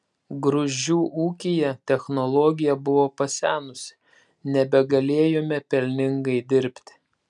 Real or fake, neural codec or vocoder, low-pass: real; none; 10.8 kHz